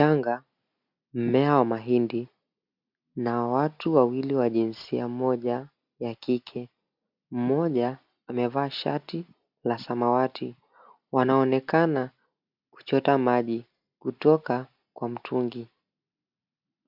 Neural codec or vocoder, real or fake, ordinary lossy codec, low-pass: none; real; MP3, 48 kbps; 5.4 kHz